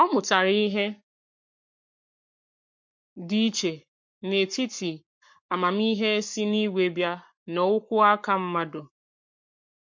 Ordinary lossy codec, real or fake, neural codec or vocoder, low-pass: MP3, 48 kbps; fake; codec, 44.1 kHz, 7.8 kbps, Pupu-Codec; 7.2 kHz